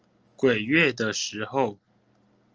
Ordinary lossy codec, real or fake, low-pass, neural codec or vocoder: Opus, 24 kbps; real; 7.2 kHz; none